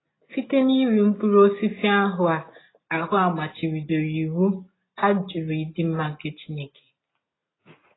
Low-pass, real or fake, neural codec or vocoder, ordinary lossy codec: 7.2 kHz; real; none; AAC, 16 kbps